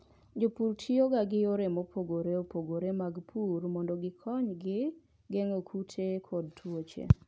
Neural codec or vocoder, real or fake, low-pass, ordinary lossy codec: none; real; none; none